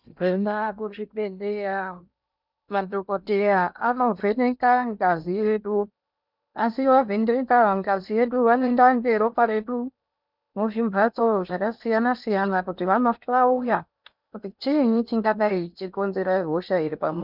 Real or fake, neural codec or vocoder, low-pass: fake; codec, 16 kHz in and 24 kHz out, 0.8 kbps, FocalCodec, streaming, 65536 codes; 5.4 kHz